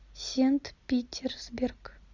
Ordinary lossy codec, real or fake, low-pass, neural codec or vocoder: Opus, 64 kbps; real; 7.2 kHz; none